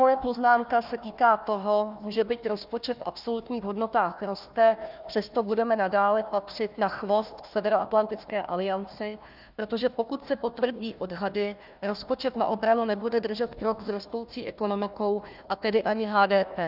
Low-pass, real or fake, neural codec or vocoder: 5.4 kHz; fake; codec, 16 kHz, 1 kbps, FunCodec, trained on Chinese and English, 50 frames a second